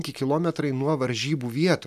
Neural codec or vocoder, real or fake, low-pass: none; real; 14.4 kHz